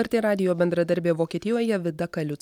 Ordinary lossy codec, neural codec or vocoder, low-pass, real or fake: MP3, 96 kbps; none; 19.8 kHz; real